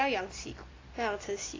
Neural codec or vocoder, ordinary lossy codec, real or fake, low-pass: none; AAC, 32 kbps; real; 7.2 kHz